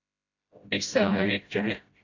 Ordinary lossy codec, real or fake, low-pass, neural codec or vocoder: AAC, 48 kbps; fake; 7.2 kHz; codec, 16 kHz, 0.5 kbps, FreqCodec, smaller model